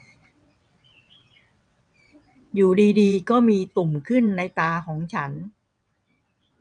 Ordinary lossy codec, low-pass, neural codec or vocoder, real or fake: none; 9.9 kHz; vocoder, 22.05 kHz, 80 mel bands, WaveNeXt; fake